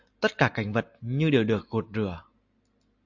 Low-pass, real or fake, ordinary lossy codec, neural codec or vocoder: 7.2 kHz; real; AAC, 48 kbps; none